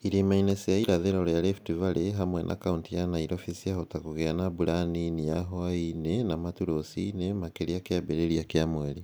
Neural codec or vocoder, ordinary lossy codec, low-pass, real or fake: none; none; none; real